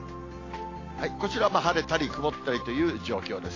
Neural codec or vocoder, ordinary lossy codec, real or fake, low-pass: none; AAC, 32 kbps; real; 7.2 kHz